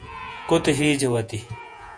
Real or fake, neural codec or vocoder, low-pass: fake; vocoder, 48 kHz, 128 mel bands, Vocos; 9.9 kHz